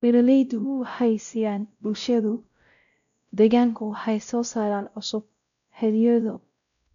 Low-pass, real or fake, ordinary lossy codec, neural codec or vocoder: 7.2 kHz; fake; none; codec, 16 kHz, 0.5 kbps, X-Codec, WavLM features, trained on Multilingual LibriSpeech